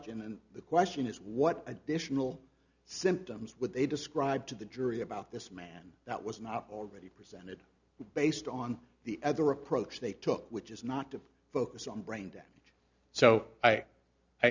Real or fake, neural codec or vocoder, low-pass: real; none; 7.2 kHz